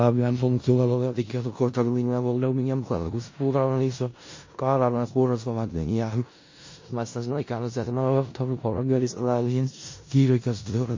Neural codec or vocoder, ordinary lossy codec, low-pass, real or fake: codec, 16 kHz in and 24 kHz out, 0.4 kbps, LongCat-Audio-Codec, four codebook decoder; MP3, 32 kbps; 7.2 kHz; fake